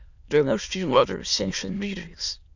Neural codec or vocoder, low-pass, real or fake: autoencoder, 22.05 kHz, a latent of 192 numbers a frame, VITS, trained on many speakers; 7.2 kHz; fake